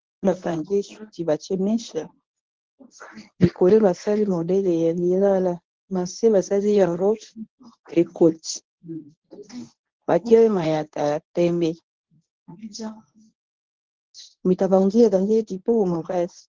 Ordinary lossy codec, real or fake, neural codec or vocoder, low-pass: Opus, 16 kbps; fake; codec, 24 kHz, 0.9 kbps, WavTokenizer, medium speech release version 1; 7.2 kHz